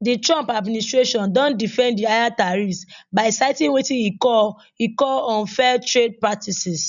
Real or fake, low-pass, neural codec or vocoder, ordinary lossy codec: real; 7.2 kHz; none; none